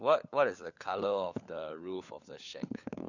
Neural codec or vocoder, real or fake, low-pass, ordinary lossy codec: codec, 16 kHz, 4 kbps, FunCodec, trained on LibriTTS, 50 frames a second; fake; 7.2 kHz; none